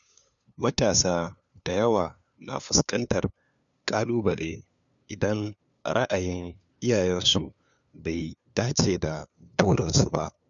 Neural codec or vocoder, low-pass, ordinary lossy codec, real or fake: codec, 16 kHz, 2 kbps, FunCodec, trained on LibriTTS, 25 frames a second; 7.2 kHz; none; fake